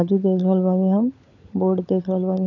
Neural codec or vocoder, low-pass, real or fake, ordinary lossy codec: codec, 16 kHz, 16 kbps, FunCodec, trained on LibriTTS, 50 frames a second; 7.2 kHz; fake; none